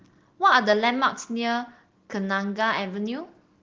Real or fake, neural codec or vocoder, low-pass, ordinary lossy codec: real; none; 7.2 kHz; Opus, 16 kbps